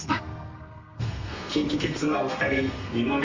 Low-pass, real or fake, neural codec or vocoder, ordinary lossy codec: 7.2 kHz; fake; codec, 32 kHz, 1.9 kbps, SNAC; Opus, 32 kbps